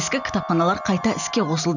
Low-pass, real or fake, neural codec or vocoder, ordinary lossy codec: 7.2 kHz; real; none; none